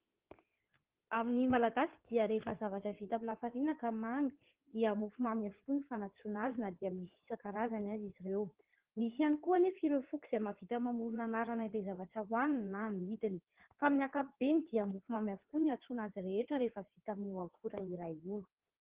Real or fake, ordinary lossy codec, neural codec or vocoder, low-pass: fake; Opus, 16 kbps; codec, 16 kHz in and 24 kHz out, 2.2 kbps, FireRedTTS-2 codec; 3.6 kHz